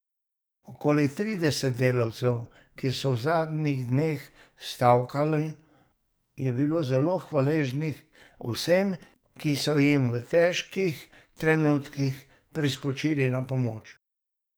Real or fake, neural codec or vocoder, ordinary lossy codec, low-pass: fake; codec, 44.1 kHz, 2.6 kbps, SNAC; none; none